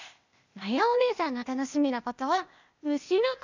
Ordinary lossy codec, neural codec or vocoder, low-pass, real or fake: none; codec, 16 kHz, 0.8 kbps, ZipCodec; 7.2 kHz; fake